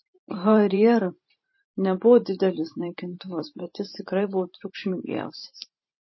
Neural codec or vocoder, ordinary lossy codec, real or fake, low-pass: vocoder, 44.1 kHz, 128 mel bands every 512 samples, BigVGAN v2; MP3, 24 kbps; fake; 7.2 kHz